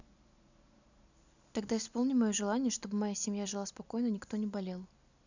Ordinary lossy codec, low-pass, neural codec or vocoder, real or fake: none; 7.2 kHz; none; real